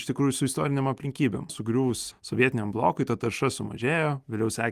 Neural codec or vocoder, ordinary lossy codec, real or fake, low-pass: none; Opus, 32 kbps; real; 14.4 kHz